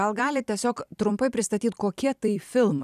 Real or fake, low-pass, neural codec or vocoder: fake; 14.4 kHz; vocoder, 44.1 kHz, 128 mel bands every 256 samples, BigVGAN v2